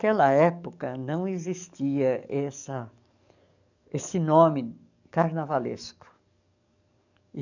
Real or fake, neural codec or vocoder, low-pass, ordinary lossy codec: fake; codec, 44.1 kHz, 7.8 kbps, DAC; 7.2 kHz; none